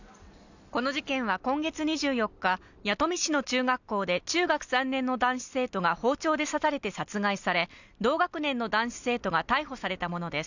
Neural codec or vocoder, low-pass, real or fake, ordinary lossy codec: none; 7.2 kHz; real; none